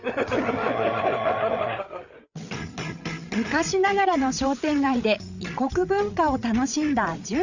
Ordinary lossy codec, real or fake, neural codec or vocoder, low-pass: none; fake; codec, 16 kHz, 8 kbps, FreqCodec, larger model; 7.2 kHz